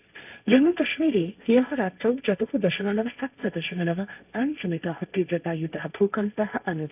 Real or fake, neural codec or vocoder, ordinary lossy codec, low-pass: fake; codec, 16 kHz, 1.1 kbps, Voila-Tokenizer; none; 3.6 kHz